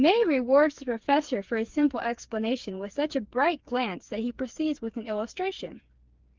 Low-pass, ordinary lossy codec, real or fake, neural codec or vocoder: 7.2 kHz; Opus, 32 kbps; fake; codec, 16 kHz, 4 kbps, FreqCodec, smaller model